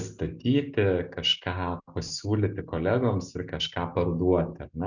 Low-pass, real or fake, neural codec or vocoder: 7.2 kHz; real; none